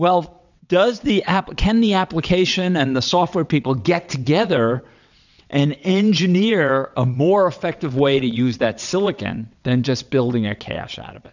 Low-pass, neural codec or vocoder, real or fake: 7.2 kHz; vocoder, 22.05 kHz, 80 mel bands, WaveNeXt; fake